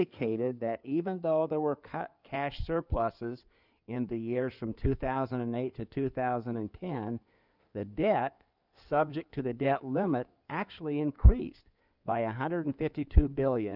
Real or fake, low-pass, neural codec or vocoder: fake; 5.4 kHz; codec, 16 kHz in and 24 kHz out, 2.2 kbps, FireRedTTS-2 codec